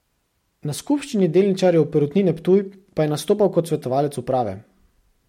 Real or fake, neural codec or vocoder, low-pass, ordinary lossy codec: real; none; 19.8 kHz; MP3, 64 kbps